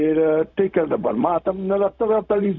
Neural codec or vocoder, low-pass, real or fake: codec, 16 kHz, 0.4 kbps, LongCat-Audio-Codec; 7.2 kHz; fake